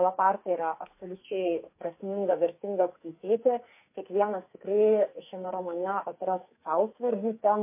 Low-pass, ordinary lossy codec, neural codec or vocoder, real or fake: 3.6 kHz; MP3, 24 kbps; codec, 44.1 kHz, 2.6 kbps, SNAC; fake